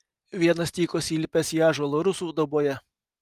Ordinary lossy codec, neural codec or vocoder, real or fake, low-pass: Opus, 32 kbps; none; real; 14.4 kHz